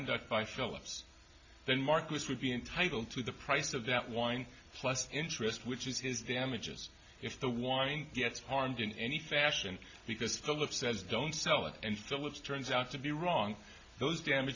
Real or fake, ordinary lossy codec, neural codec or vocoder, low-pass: real; AAC, 48 kbps; none; 7.2 kHz